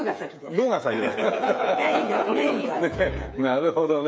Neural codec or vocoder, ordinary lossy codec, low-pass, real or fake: codec, 16 kHz, 8 kbps, FreqCodec, smaller model; none; none; fake